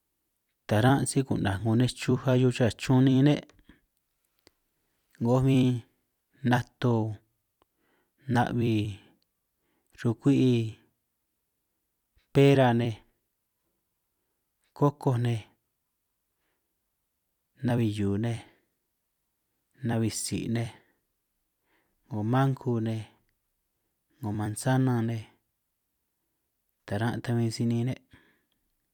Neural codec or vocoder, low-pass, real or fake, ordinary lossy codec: vocoder, 44.1 kHz, 128 mel bands every 256 samples, BigVGAN v2; 19.8 kHz; fake; none